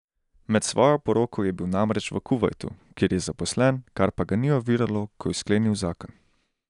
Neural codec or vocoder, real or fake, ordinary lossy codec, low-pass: none; real; none; 10.8 kHz